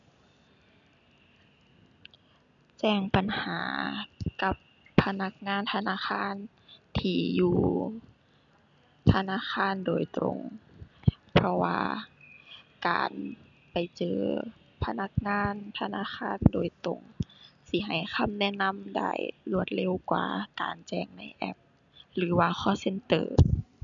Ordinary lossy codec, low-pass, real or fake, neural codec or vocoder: none; 7.2 kHz; real; none